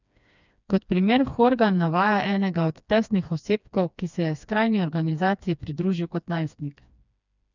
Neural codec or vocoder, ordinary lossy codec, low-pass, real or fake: codec, 16 kHz, 2 kbps, FreqCodec, smaller model; none; 7.2 kHz; fake